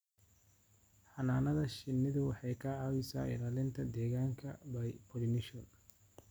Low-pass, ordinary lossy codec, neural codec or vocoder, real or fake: none; none; none; real